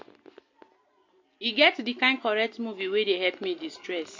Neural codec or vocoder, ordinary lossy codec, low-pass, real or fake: none; MP3, 48 kbps; 7.2 kHz; real